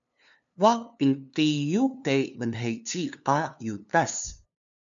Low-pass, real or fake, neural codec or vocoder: 7.2 kHz; fake; codec, 16 kHz, 2 kbps, FunCodec, trained on LibriTTS, 25 frames a second